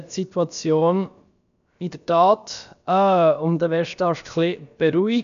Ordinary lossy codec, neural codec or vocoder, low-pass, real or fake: none; codec, 16 kHz, about 1 kbps, DyCAST, with the encoder's durations; 7.2 kHz; fake